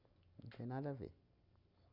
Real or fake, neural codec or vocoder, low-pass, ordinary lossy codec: real; none; 5.4 kHz; none